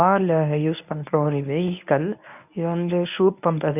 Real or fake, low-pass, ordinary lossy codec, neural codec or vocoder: fake; 3.6 kHz; none; codec, 24 kHz, 0.9 kbps, WavTokenizer, medium speech release version 1